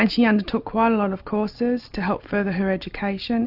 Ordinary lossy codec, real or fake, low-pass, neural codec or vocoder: AAC, 48 kbps; real; 5.4 kHz; none